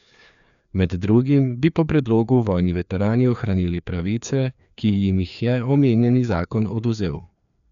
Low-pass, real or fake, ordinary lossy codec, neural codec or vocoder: 7.2 kHz; fake; none; codec, 16 kHz, 2 kbps, FreqCodec, larger model